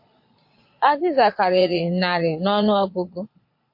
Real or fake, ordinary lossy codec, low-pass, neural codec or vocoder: fake; MP3, 32 kbps; 5.4 kHz; vocoder, 22.05 kHz, 80 mel bands, Vocos